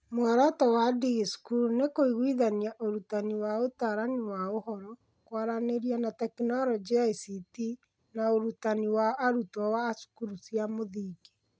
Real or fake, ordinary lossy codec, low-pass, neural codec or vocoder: real; none; none; none